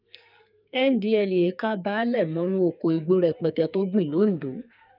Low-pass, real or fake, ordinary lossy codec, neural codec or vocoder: 5.4 kHz; fake; none; codec, 44.1 kHz, 2.6 kbps, SNAC